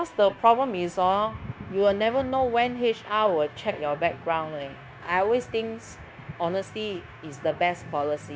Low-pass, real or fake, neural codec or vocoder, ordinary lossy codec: none; fake; codec, 16 kHz, 0.9 kbps, LongCat-Audio-Codec; none